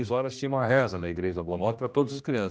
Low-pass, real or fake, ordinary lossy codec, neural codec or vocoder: none; fake; none; codec, 16 kHz, 1 kbps, X-Codec, HuBERT features, trained on general audio